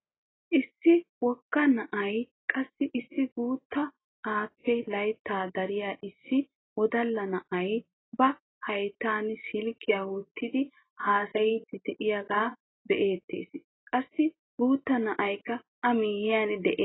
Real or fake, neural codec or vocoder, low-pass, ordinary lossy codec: real; none; 7.2 kHz; AAC, 16 kbps